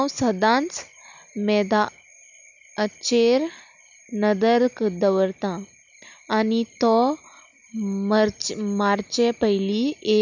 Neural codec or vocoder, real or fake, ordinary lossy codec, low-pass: none; real; none; 7.2 kHz